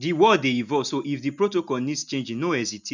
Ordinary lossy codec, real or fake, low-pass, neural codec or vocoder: none; real; 7.2 kHz; none